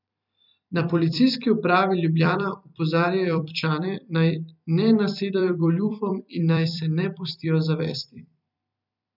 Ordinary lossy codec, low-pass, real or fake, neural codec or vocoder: none; 5.4 kHz; fake; vocoder, 44.1 kHz, 128 mel bands every 256 samples, BigVGAN v2